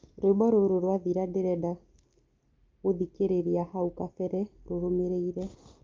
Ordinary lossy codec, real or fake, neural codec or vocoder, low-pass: Opus, 24 kbps; real; none; 7.2 kHz